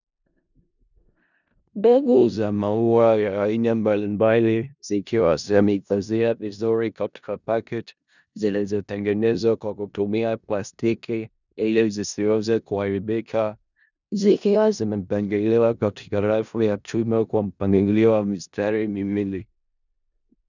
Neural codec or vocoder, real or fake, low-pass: codec, 16 kHz in and 24 kHz out, 0.4 kbps, LongCat-Audio-Codec, four codebook decoder; fake; 7.2 kHz